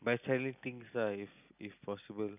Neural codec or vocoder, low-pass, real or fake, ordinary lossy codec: none; 3.6 kHz; real; none